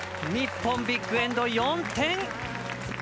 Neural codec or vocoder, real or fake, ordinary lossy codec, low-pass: none; real; none; none